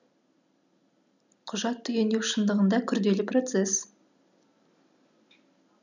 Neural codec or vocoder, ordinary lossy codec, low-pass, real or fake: none; none; 7.2 kHz; real